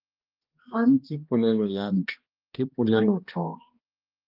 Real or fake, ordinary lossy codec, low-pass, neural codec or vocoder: fake; Opus, 24 kbps; 5.4 kHz; codec, 16 kHz, 1 kbps, X-Codec, HuBERT features, trained on balanced general audio